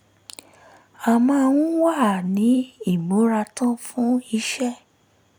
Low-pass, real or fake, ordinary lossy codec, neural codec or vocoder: none; real; none; none